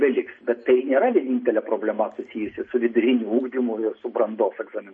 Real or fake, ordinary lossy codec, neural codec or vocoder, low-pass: real; MP3, 32 kbps; none; 9.9 kHz